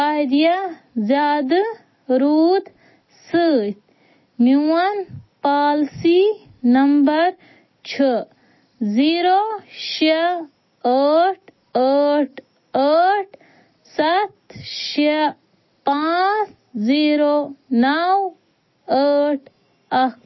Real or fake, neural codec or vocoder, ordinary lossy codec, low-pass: real; none; MP3, 24 kbps; 7.2 kHz